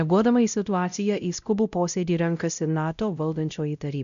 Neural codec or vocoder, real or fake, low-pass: codec, 16 kHz, 0.5 kbps, X-Codec, WavLM features, trained on Multilingual LibriSpeech; fake; 7.2 kHz